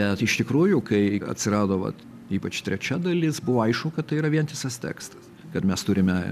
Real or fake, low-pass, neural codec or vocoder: real; 14.4 kHz; none